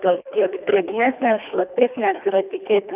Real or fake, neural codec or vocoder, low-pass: fake; codec, 24 kHz, 1.5 kbps, HILCodec; 3.6 kHz